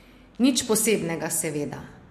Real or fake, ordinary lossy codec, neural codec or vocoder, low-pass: real; MP3, 64 kbps; none; 14.4 kHz